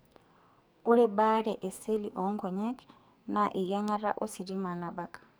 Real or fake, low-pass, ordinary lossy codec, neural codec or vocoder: fake; none; none; codec, 44.1 kHz, 2.6 kbps, SNAC